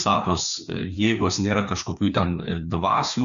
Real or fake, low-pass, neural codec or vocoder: fake; 7.2 kHz; codec, 16 kHz, 2 kbps, FreqCodec, larger model